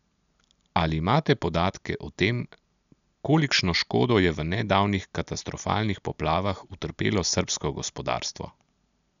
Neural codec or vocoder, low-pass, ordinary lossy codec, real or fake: none; 7.2 kHz; none; real